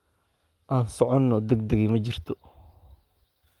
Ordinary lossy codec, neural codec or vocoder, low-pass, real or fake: Opus, 32 kbps; codec, 44.1 kHz, 7.8 kbps, Pupu-Codec; 19.8 kHz; fake